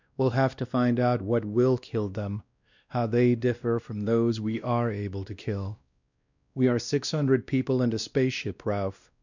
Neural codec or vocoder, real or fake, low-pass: codec, 16 kHz, 1 kbps, X-Codec, WavLM features, trained on Multilingual LibriSpeech; fake; 7.2 kHz